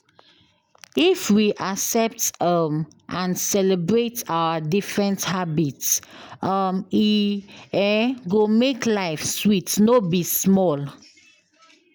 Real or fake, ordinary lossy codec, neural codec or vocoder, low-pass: real; none; none; none